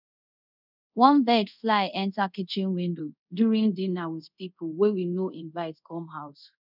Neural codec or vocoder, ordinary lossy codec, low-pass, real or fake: codec, 24 kHz, 0.5 kbps, DualCodec; none; 5.4 kHz; fake